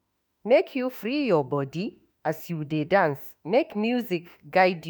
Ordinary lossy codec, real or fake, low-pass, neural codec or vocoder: none; fake; none; autoencoder, 48 kHz, 32 numbers a frame, DAC-VAE, trained on Japanese speech